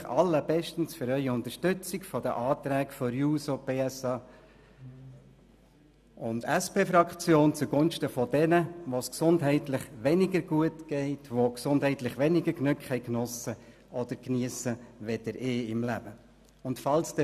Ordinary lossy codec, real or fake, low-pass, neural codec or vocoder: none; real; 14.4 kHz; none